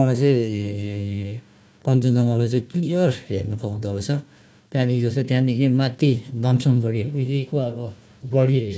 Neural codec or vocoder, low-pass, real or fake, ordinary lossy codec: codec, 16 kHz, 1 kbps, FunCodec, trained on Chinese and English, 50 frames a second; none; fake; none